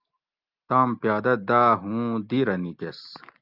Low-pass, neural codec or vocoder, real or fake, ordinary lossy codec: 5.4 kHz; none; real; Opus, 24 kbps